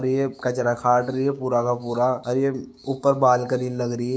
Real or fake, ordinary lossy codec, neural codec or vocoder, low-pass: fake; none; codec, 16 kHz, 6 kbps, DAC; none